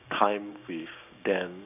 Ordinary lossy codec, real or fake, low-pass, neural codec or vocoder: none; fake; 3.6 kHz; codec, 16 kHz, 6 kbps, DAC